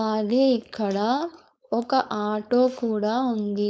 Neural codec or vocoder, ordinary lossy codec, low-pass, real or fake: codec, 16 kHz, 4.8 kbps, FACodec; none; none; fake